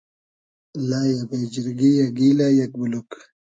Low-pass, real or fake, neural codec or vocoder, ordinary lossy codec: 9.9 kHz; real; none; MP3, 96 kbps